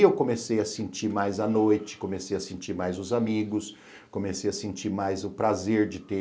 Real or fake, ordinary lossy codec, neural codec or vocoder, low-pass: real; none; none; none